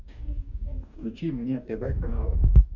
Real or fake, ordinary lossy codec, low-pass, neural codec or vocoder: fake; none; 7.2 kHz; codec, 44.1 kHz, 2.6 kbps, DAC